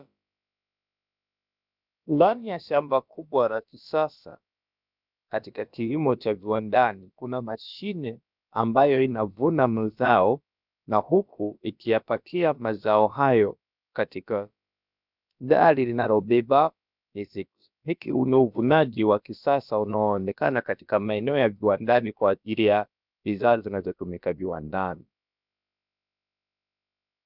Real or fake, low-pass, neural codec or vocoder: fake; 5.4 kHz; codec, 16 kHz, about 1 kbps, DyCAST, with the encoder's durations